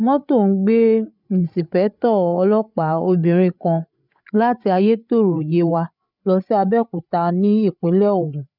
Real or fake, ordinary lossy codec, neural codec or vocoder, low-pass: fake; none; codec, 16 kHz, 8 kbps, FreqCodec, larger model; 5.4 kHz